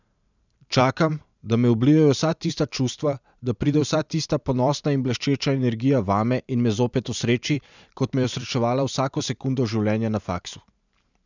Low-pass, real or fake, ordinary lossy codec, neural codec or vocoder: 7.2 kHz; fake; none; vocoder, 44.1 kHz, 128 mel bands every 512 samples, BigVGAN v2